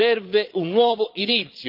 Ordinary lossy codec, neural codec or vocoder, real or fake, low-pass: Opus, 24 kbps; vocoder, 22.05 kHz, 80 mel bands, Vocos; fake; 5.4 kHz